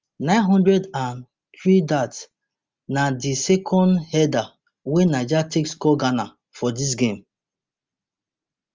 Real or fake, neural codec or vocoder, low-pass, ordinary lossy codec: real; none; 7.2 kHz; Opus, 32 kbps